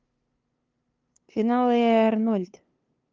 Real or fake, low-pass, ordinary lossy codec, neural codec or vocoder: fake; 7.2 kHz; Opus, 32 kbps; codec, 16 kHz, 2 kbps, FunCodec, trained on LibriTTS, 25 frames a second